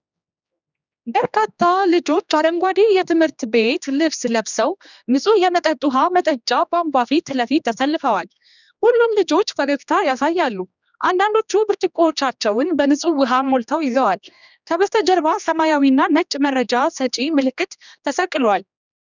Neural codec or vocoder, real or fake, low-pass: codec, 16 kHz, 2 kbps, X-Codec, HuBERT features, trained on general audio; fake; 7.2 kHz